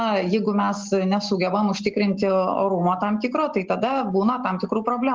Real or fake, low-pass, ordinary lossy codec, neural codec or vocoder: real; 7.2 kHz; Opus, 16 kbps; none